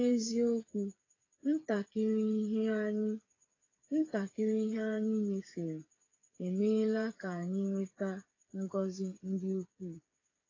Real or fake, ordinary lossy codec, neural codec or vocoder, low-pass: fake; AAC, 32 kbps; codec, 16 kHz, 8 kbps, FreqCodec, smaller model; 7.2 kHz